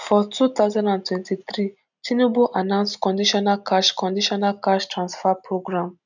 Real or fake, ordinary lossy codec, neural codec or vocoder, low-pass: real; none; none; 7.2 kHz